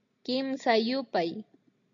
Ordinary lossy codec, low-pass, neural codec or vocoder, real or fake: MP3, 48 kbps; 7.2 kHz; none; real